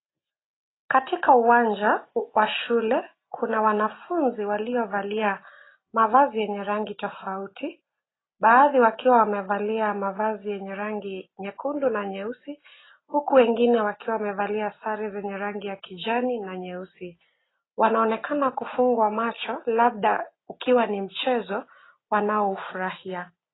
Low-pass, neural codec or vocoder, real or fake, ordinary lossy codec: 7.2 kHz; none; real; AAC, 16 kbps